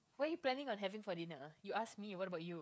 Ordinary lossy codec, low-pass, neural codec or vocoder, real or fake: none; none; codec, 16 kHz, 16 kbps, FreqCodec, smaller model; fake